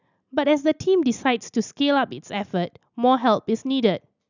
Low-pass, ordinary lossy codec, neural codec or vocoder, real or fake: 7.2 kHz; none; none; real